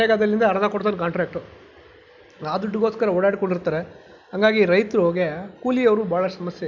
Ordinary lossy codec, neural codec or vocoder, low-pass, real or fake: none; none; 7.2 kHz; real